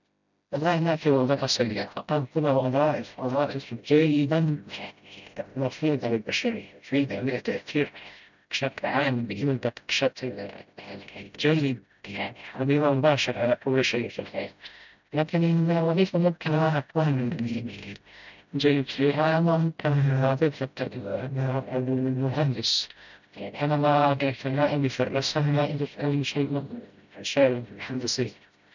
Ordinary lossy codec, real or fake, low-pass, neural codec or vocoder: none; fake; 7.2 kHz; codec, 16 kHz, 0.5 kbps, FreqCodec, smaller model